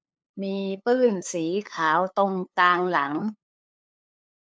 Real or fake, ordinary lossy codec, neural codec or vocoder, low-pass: fake; none; codec, 16 kHz, 2 kbps, FunCodec, trained on LibriTTS, 25 frames a second; none